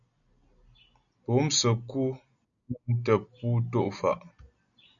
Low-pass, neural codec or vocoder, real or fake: 7.2 kHz; none; real